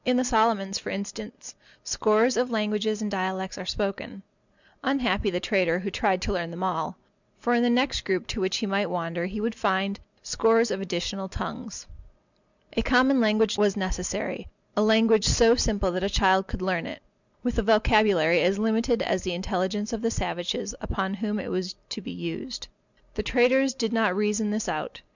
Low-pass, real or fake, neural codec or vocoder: 7.2 kHz; real; none